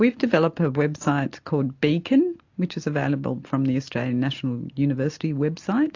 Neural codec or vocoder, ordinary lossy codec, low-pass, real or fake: none; AAC, 48 kbps; 7.2 kHz; real